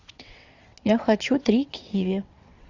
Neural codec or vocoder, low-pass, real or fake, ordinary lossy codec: vocoder, 22.05 kHz, 80 mel bands, WaveNeXt; 7.2 kHz; fake; Opus, 64 kbps